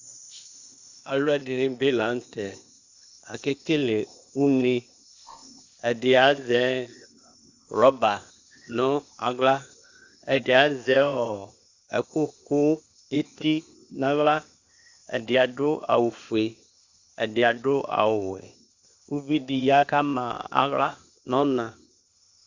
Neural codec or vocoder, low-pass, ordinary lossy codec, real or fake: codec, 16 kHz, 0.8 kbps, ZipCodec; 7.2 kHz; Opus, 64 kbps; fake